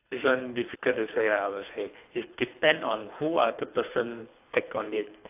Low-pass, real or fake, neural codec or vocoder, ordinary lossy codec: 3.6 kHz; fake; codec, 24 kHz, 3 kbps, HILCodec; AAC, 32 kbps